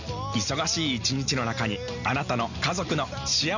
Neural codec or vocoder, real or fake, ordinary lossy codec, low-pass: none; real; none; 7.2 kHz